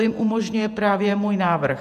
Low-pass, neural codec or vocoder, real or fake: 14.4 kHz; vocoder, 48 kHz, 128 mel bands, Vocos; fake